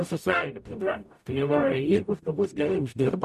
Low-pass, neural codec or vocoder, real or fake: 14.4 kHz; codec, 44.1 kHz, 0.9 kbps, DAC; fake